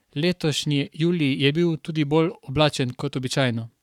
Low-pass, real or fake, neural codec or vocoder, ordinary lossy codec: 19.8 kHz; fake; codec, 44.1 kHz, 7.8 kbps, Pupu-Codec; none